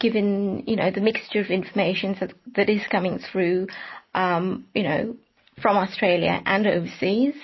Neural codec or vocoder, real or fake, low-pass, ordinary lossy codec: none; real; 7.2 kHz; MP3, 24 kbps